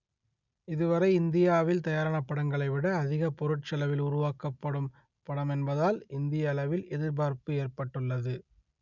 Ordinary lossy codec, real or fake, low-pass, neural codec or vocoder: none; real; 7.2 kHz; none